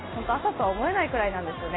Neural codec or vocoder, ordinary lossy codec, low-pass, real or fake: none; AAC, 16 kbps; 7.2 kHz; real